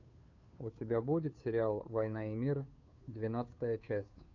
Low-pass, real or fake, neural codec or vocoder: 7.2 kHz; fake; codec, 16 kHz, 2 kbps, FunCodec, trained on Chinese and English, 25 frames a second